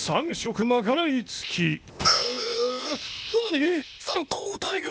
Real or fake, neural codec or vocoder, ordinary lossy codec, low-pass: fake; codec, 16 kHz, 0.8 kbps, ZipCodec; none; none